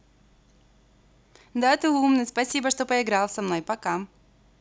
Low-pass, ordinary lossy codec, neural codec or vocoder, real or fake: none; none; none; real